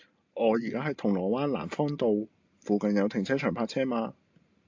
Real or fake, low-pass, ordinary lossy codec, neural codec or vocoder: real; 7.2 kHz; AAC, 48 kbps; none